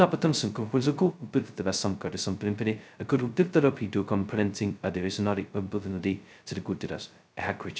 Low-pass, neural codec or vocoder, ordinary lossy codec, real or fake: none; codec, 16 kHz, 0.2 kbps, FocalCodec; none; fake